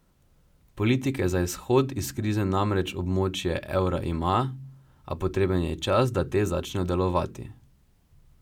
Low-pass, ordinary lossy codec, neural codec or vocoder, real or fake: 19.8 kHz; none; none; real